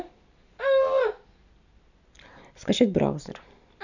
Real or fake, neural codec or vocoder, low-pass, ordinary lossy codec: real; none; 7.2 kHz; none